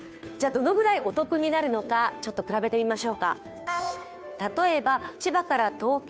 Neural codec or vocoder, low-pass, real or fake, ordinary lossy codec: codec, 16 kHz, 2 kbps, FunCodec, trained on Chinese and English, 25 frames a second; none; fake; none